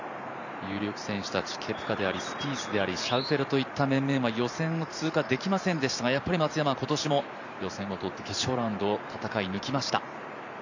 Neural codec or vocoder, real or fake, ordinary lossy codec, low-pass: vocoder, 44.1 kHz, 128 mel bands every 512 samples, BigVGAN v2; fake; none; 7.2 kHz